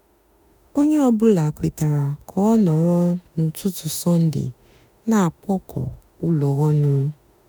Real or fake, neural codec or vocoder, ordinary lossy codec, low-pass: fake; autoencoder, 48 kHz, 32 numbers a frame, DAC-VAE, trained on Japanese speech; none; none